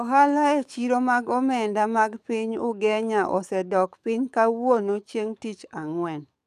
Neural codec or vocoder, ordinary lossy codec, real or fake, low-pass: codec, 44.1 kHz, 7.8 kbps, DAC; none; fake; 14.4 kHz